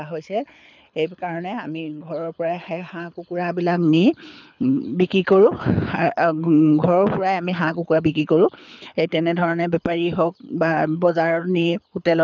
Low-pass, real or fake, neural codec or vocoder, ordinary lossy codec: 7.2 kHz; fake; codec, 24 kHz, 6 kbps, HILCodec; none